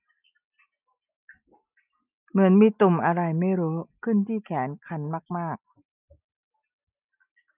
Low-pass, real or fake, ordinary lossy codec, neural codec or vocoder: 3.6 kHz; real; none; none